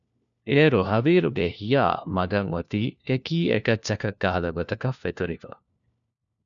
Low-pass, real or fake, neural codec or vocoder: 7.2 kHz; fake; codec, 16 kHz, 1 kbps, FunCodec, trained on LibriTTS, 50 frames a second